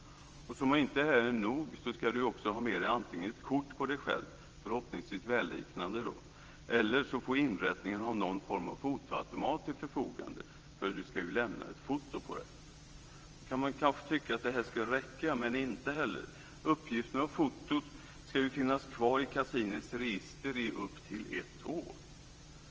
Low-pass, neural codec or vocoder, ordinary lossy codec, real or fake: 7.2 kHz; vocoder, 22.05 kHz, 80 mel bands, WaveNeXt; Opus, 24 kbps; fake